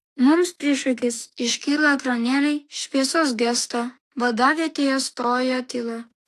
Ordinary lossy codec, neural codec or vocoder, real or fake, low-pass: AAC, 64 kbps; autoencoder, 48 kHz, 32 numbers a frame, DAC-VAE, trained on Japanese speech; fake; 14.4 kHz